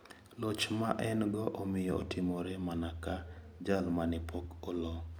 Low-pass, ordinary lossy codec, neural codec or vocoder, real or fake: none; none; none; real